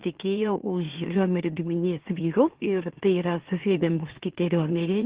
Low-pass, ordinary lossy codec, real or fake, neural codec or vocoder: 3.6 kHz; Opus, 16 kbps; fake; autoencoder, 44.1 kHz, a latent of 192 numbers a frame, MeloTTS